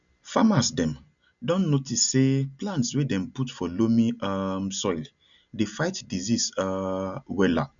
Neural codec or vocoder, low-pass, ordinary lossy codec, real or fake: none; 7.2 kHz; none; real